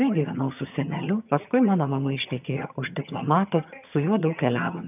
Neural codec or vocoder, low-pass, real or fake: vocoder, 22.05 kHz, 80 mel bands, HiFi-GAN; 3.6 kHz; fake